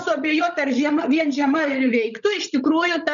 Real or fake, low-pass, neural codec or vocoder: fake; 7.2 kHz; codec, 16 kHz, 16 kbps, FreqCodec, larger model